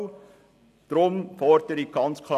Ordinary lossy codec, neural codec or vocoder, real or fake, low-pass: AAC, 96 kbps; none; real; 14.4 kHz